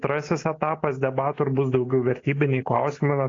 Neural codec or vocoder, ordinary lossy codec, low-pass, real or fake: none; AAC, 32 kbps; 7.2 kHz; real